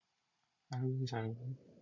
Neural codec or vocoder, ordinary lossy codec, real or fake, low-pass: none; MP3, 64 kbps; real; 7.2 kHz